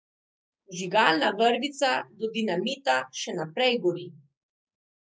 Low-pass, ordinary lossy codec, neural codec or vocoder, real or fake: none; none; codec, 16 kHz, 6 kbps, DAC; fake